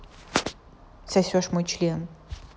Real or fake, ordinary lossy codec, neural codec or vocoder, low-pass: real; none; none; none